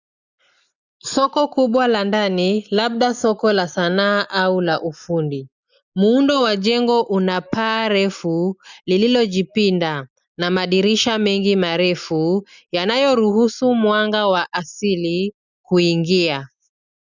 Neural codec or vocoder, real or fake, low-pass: none; real; 7.2 kHz